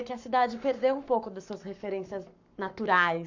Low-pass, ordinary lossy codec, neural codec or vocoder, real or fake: 7.2 kHz; none; codec, 44.1 kHz, 7.8 kbps, Pupu-Codec; fake